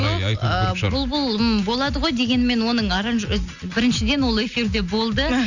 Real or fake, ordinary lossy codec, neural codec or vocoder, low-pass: real; none; none; 7.2 kHz